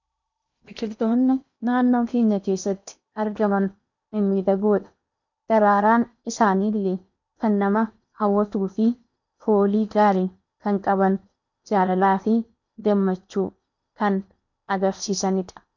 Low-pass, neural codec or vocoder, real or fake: 7.2 kHz; codec, 16 kHz in and 24 kHz out, 0.8 kbps, FocalCodec, streaming, 65536 codes; fake